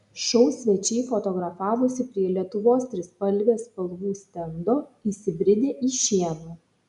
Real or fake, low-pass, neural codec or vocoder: real; 10.8 kHz; none